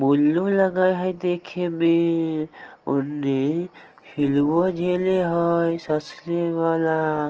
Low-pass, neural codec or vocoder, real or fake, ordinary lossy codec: 7.2 kHz; none; real; Opus, 16 kbps